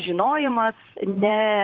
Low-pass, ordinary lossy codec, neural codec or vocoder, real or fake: 7.2 kHz; Opus, 24 kbps; vocoder, 44.1 kHz, 128 mel bands, Pupu-Vocoder; fake